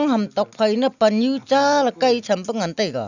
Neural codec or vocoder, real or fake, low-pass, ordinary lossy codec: none; real; 7.2 kHz; none